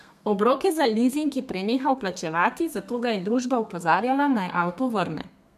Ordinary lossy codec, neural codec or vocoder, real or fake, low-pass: none; codec, 32 kHz, 1.9 kbps, SNAC; fake; 14.4 kHz